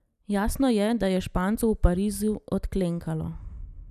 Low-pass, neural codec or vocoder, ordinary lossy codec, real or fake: 14.4 kHz; none; none; real